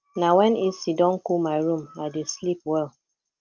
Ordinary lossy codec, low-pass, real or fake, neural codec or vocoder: Opus, 32 kbps; 7.2 kHz; real; none